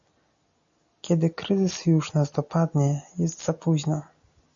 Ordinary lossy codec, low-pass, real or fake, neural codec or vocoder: AAC, 64 kbps; 7.2 kHz; real; none